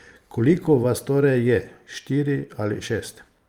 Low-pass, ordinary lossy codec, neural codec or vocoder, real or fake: 19.8 kHz; Opus, 32 kbps; vocoder, 44.1 kHz, 128 mel bands every 512 samples, BigVGAN v2; fake